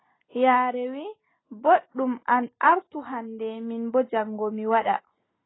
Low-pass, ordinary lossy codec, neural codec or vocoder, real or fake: 7.2 kHz; AAC, 16 kbps; none; real